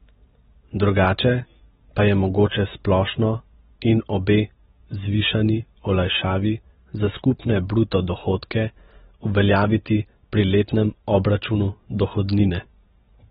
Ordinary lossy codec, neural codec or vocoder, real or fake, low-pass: AAC, 16 kbps; none; real; 19.8 kHz